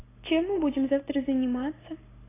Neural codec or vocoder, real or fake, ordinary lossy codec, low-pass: none; real; AAC, 16 kbps; 3.6 kHz